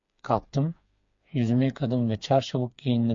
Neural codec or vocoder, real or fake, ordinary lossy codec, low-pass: codec, 16 kHz, 4 kbps, FreqCodec, smaller model; fake; MP3, 48 kbps; 7.2 kHz